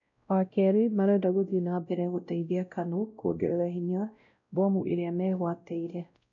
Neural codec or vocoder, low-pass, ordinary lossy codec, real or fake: codec, 16 kHz, 0.5 kbps, X-Codec, WavLM features, trained on Multilingual LibriSpeech; 7.2 kHz; none; fake